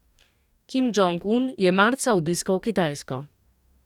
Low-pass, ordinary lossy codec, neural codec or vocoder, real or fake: 19.8 kHz; none; codec, 44.1 kHz, 2.6 kbps, DAC; fake